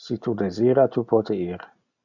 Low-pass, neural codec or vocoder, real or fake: 7.2 kHz; none; real